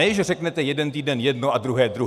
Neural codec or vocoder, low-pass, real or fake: none; 14.4 kHz; real